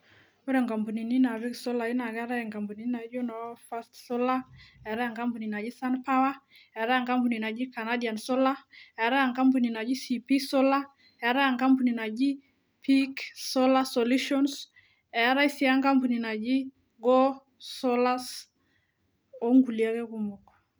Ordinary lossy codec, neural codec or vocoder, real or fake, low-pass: none; none; real; none